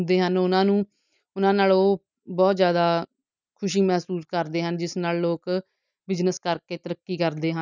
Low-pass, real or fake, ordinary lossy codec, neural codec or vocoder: 7.2 kHz; real; none; none